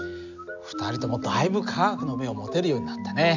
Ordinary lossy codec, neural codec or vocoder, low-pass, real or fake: none; none; 7.2 kHz; real